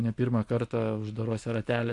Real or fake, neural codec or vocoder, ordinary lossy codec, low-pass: real; none; MP3, 64 kbps; 10.8 kHz